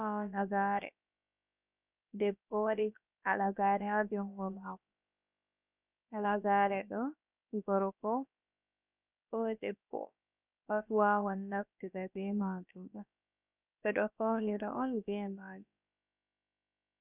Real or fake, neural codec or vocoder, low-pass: fake; codec, 16 kHz, about 1 kbps, DyCAST, with the encoder's durations; 3.6 kHz